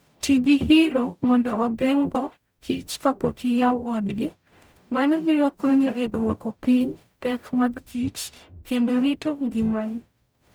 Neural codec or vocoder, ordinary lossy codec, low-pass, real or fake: codec, 44.1 kHz, 0.9 kbps, DAC; none; none; fake